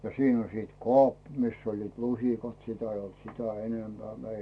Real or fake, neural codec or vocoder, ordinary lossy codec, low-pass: real; none; none; none